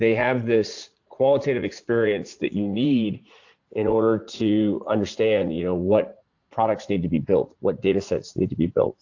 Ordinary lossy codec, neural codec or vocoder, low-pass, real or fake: AAC, 48 kbps; vocoder, 44.1 kHz, 80 mel bands, Vocos; 7.2 kHz; fake